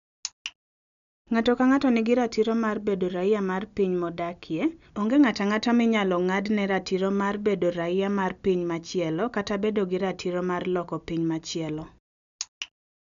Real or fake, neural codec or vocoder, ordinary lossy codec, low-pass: real; none; none; 7.2 kHz